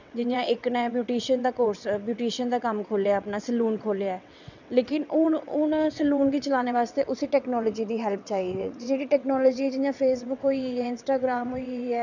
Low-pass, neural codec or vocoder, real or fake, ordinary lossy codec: 7.2 kHz; vocoder, 22.05 kHz, 80 mel bands, WaveNeXt; fake; none